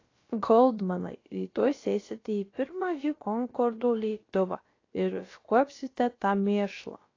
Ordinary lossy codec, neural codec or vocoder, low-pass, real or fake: AAC, 32 kbps; codec, 16 kHz, about 1 kbps, DyCAST, with the encoder's durations; 7.2 kHz; fake